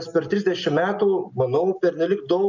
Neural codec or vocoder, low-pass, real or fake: none; 7.2 kHz; real